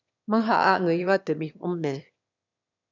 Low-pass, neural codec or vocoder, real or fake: 7.2 kHz; autoencoder, 22.05 kHz, a latent of 192 numbers a frame, VITS, trained on one speaker; fake